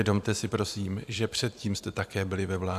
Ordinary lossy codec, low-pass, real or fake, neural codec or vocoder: AAC, 96 kbps; 14.4 kHz; fake; vocoder, 44.1 kHz, 128 mel bands every 512 samples, BigVGAN v2